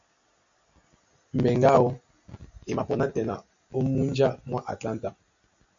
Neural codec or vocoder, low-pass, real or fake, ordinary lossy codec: none; 7.2 kHz; real; AAC, 64 kbps